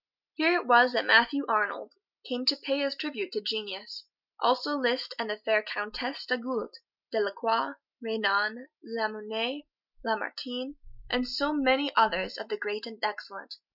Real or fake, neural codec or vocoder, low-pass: real; none; 5.4 kHz